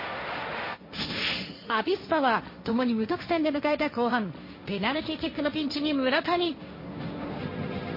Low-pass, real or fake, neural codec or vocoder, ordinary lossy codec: 5.4 kHz; fake; codec, 16 kHz, 1.1 kbps, Voila-Tokenizer; MP3, 24 kbps